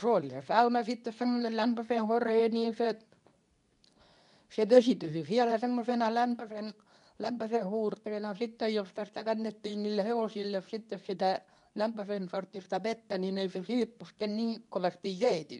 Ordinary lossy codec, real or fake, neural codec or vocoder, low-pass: none; fake; codec, 24 kHz, 0.9 kbps, WavTokenizer, medium speech release version 2; 10.8 kHz